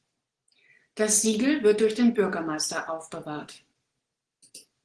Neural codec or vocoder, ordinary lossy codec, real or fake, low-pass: none; Opus, 16 kbps; real; 9.9 kHz